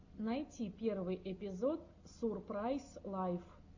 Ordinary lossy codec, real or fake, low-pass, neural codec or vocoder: AAC, 48 kbps; real; 7.2 kHz; none